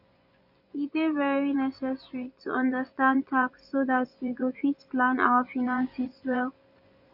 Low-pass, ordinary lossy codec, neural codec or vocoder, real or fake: 5.4 kHz; none; vocoder, 24 kHz, 100 mel bands, Vocos; fake